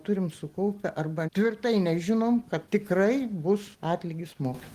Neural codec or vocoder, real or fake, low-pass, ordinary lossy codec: none; real; 14.4 kHz; Opus, 24 kbps